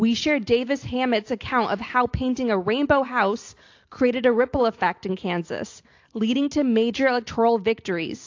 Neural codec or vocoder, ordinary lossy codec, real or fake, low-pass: none; AAC, 48 kbps; real; 7.2 kHz